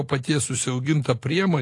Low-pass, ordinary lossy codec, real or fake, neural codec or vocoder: 10.8 kHz; AAC, 48 kbps; real; none